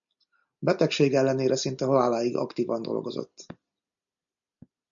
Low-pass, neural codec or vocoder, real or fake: 7.2 kHz; none; real